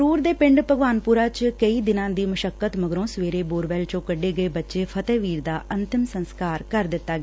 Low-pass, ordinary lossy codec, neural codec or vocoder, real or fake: none; none; none; real